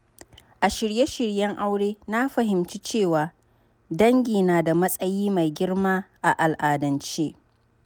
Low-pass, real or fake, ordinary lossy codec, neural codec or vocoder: none; real; none; none